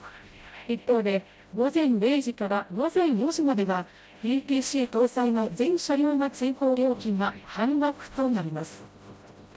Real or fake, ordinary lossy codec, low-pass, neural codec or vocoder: fake; none; none; codec, 16 kHz, 0.5 kbps, FreqCodec, smaller model